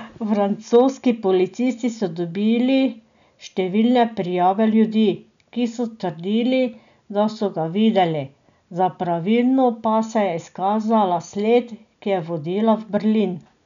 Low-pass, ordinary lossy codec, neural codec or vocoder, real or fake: 7.2 kHz; none; none; real